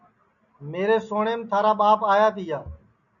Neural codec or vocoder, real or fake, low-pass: none; real; 7.2 kHz